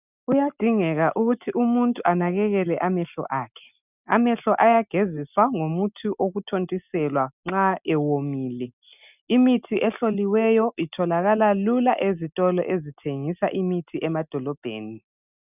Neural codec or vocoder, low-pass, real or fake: none; 3.6 kHz; real